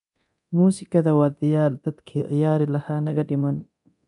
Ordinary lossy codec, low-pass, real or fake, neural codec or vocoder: none; 10.8 kHz; fake; codec, 24 kHz, 0.9 kbps, DualCodec